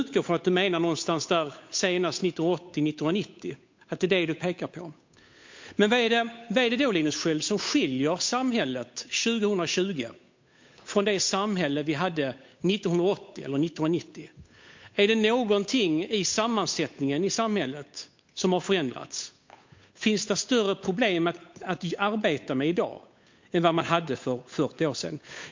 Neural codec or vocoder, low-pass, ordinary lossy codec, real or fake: codec, 16 kHz, 8 kbps, FunCodec, trained on Chinese and English, 25 frames a second; 7.2 kHz; MP3, 48 kbps; fake